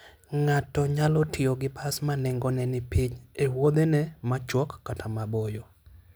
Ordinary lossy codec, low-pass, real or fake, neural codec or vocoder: none; none; real; none